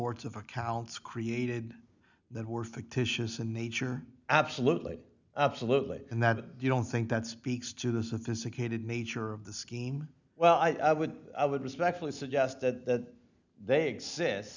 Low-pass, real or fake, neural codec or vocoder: 7.2 kHz; real; none